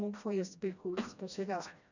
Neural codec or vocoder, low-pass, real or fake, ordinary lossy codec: codec, 16 kHz, 1 kbps, FreqCodec, smaller model; 7.2 kHz; fake; none